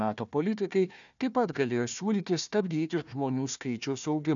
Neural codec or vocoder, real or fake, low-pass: codec, 16 kHz, 1 kbps, FunCodec, trained on Chinese and English, 50 frames a second; fake; 7.2 kHz